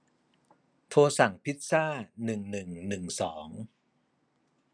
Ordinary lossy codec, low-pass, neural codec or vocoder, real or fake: none; 9.9 kHz; none; real